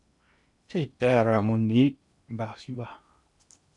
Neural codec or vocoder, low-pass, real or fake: codec, 16 kHz in and 24 kHz out, 0.8 kbps, FocalCodec, streaming, 65536 codes; 10.8 kHz; fake